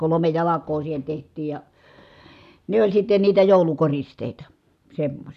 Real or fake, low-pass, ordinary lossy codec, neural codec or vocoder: real; 14.4 kHz; none; none